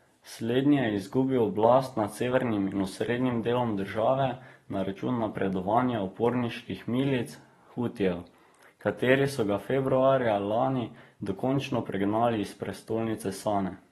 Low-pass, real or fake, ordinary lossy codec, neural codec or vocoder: 19.8 kHz; fake; AAC, 32 kbps; vocoder, 44.1 kHz, 128 mel bands every 512 samples, BigVGAN v2